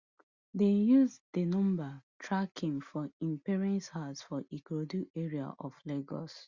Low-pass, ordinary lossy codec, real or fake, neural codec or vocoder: 7.2 kHz; Opus, 64 kbps; real; none